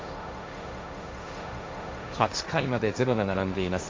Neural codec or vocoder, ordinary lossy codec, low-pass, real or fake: codec, 16 kHz, 1.1 kbps, Voila-Tokenizer; none; none; fake